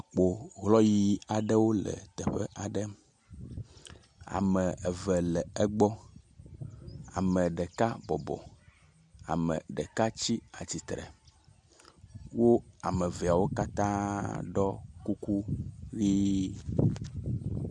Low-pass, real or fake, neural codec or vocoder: 10.8 kHz; real; none